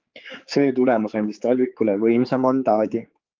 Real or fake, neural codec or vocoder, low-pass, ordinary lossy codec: fake; codec, 16 kHz, 4 kbps, X-Codec, HuBERT features, trained on general audio; 7.2 kHz; Opus, 32 kbps